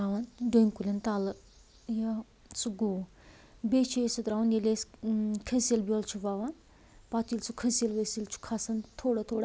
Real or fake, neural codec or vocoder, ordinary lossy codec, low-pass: real; none; none; none